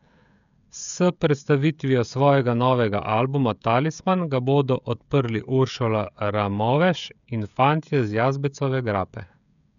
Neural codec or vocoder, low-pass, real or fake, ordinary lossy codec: codec, 16 kHz, 16 kbps, FreqCodec, smaller model; 7.2 kHz; fake; none